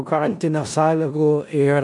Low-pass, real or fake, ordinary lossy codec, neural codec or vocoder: 10.8 kHz; fake; MP3, 64 kbps; codec, 16 kHz in and 24 kHz out, 0.4 kbps, LongCat-Audio-Codec, four codebook decoder